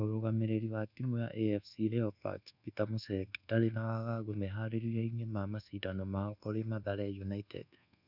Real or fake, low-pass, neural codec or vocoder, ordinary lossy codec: fake; 5.4 kHz; codec, 24 kHz, 1.2 kbps, DualCodec; none